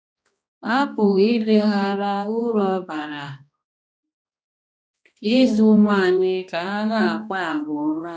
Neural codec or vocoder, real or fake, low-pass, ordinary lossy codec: codec, 16 kHz, 1 kbps, X-Codec, HuBERT features, trained on balanced general audio; fake; none; none